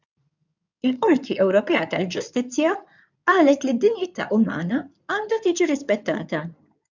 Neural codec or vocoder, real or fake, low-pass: codec, 16 kHz in and 24 kHz out, 2.2 kbps, FireRedTTS-2 codec; fake; 7.2 kHz